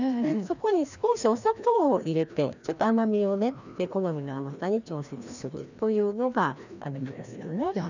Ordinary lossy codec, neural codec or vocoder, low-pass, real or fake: none; codec, 16 kHz, 1 kbps, FreqCodec, larger model; 7.2 kHz; fake